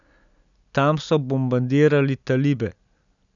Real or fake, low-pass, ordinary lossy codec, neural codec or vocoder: real; 7.2 kHz; none; none